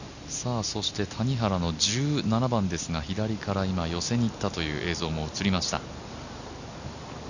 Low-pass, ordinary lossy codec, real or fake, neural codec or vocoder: 7.2 kHz; none; real; none